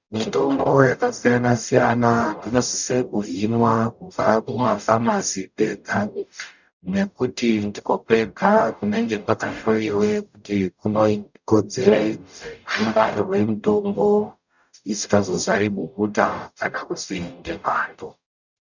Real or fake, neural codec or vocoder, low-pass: fake; codec, 44.1 kHz, 0.9 kbps, DAC; 7.2 kHz